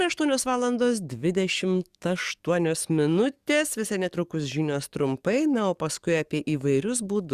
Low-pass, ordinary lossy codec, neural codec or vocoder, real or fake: 14.4 kHz; Opus, 64 kbps; codec, 44.1 kHz, 7.8 kbps, DAC; fake